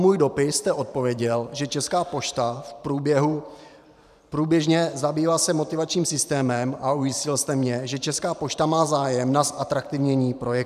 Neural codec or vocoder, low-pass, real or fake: vocoder, 44.1 kHz, 128 mel bands every 512 samples, BigVGAN v2; 14.4 kHz; fake